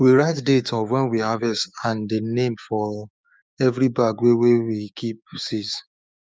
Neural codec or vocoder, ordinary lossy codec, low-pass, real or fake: codec, 16 kHz, 6 kbps, DAC; none; none; fake